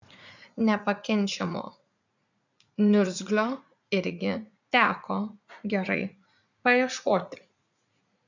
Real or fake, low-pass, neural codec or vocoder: fake; 7.2 kHz; vocoder, 44.1 kHz, 80 mel bands, Vocos